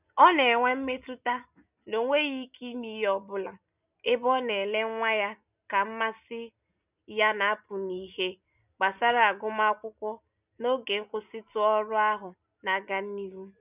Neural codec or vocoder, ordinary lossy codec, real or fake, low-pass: none; none; real; 3.6 kHz